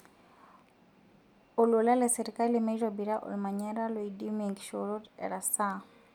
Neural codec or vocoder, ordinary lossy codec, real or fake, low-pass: none; none; real; 19.8 kHz